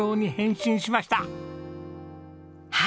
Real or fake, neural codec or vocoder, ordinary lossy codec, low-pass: real; none; none; none